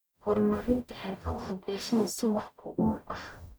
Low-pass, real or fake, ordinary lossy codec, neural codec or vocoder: none; fake; none; codec, 44.1 kHz, 0.9 kbps, DAC